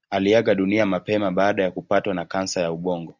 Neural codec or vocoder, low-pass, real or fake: none; 7.2 kHz; real